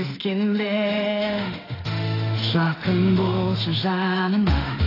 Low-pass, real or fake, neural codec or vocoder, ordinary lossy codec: 5.4 kHz; fake; autoencoder, 48 kHz, 32 numbers a frame, DAC-VAE, trained on Japanese speech; AAC, 24 kbps